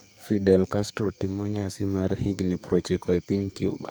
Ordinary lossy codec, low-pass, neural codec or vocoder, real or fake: none; none; codec, 44.1 kHz, 2.6 kbps, SNAC; fake